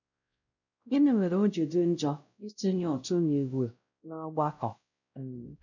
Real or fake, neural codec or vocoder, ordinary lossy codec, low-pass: fake; codec, 16 kHz, 0.5 kbps, X-Codec, WavLM features, trained on Multilingual LibriSpeech; none; 7.2 kHz